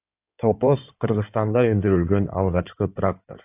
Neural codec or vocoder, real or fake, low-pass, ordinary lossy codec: codec, 16 kHz in and 24 kHz out, 2.2 kbps, FireRedTTS-2 codec; fake; 3.6 kHz; AAC, 32 kbps